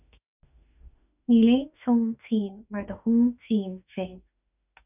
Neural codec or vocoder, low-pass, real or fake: autoencoder, 48 kHz, 32 numbers a frame, DAC-VAE, trained on Japanese speech; 3.6 kHz; fake